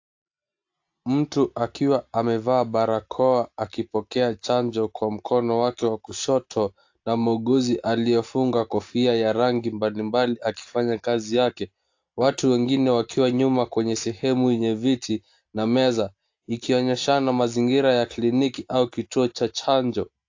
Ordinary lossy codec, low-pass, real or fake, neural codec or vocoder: AAC, 48 kbps; 7.2 kHz; real; none